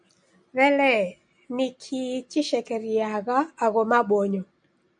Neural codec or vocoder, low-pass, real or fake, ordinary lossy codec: none; 9.9 kHz; real; MP3, 96 kbps